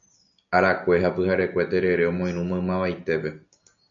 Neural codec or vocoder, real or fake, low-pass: none; real; 7.2 kHz